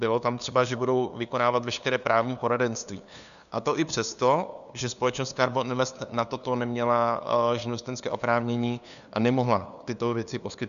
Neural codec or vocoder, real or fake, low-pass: codec, 16 kHz, 2 kbps, FunCodec, trained on LibriTTS, 25 frames a second; fake; 7.2 kHz